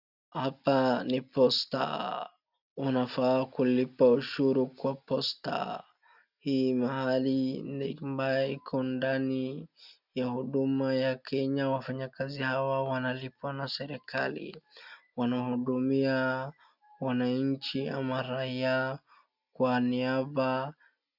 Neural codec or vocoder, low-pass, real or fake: none; 5.4 kHz; real